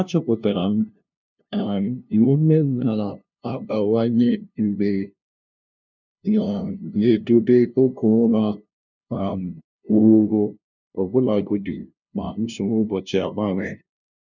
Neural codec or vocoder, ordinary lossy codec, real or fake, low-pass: codec, 16 kHz, 0.5 kbps, FunCodec, trained on LibriTTS, 25 frames a second; none; fake; 7.2 kHz